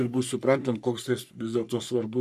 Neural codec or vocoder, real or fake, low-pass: codec, 44.1 kHz, 3.4 kbps, Pupu-Codec; fake; 14.4 kHz